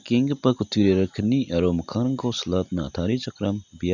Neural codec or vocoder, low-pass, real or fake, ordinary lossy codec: none; 7.2 kHz; real; none